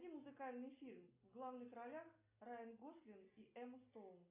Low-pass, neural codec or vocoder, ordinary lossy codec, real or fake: 3.6 kHz; none; AAC, 32 kbps; real